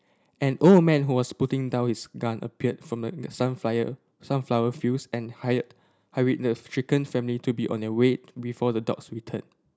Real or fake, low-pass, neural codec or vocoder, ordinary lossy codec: real; none; none; none